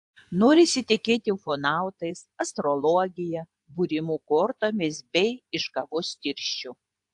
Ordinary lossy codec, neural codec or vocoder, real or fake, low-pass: AAC, 64 kbps; vocoder, 24 kHz, 100 mel bands, Vocos; fake; 10.8 kHz